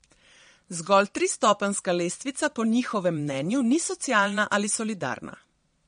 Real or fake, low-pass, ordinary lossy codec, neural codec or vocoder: fake; 9.9 kHz; MP3, 48 kbps; vocoder, 22.05 kHz, 80 mel bands, Vocos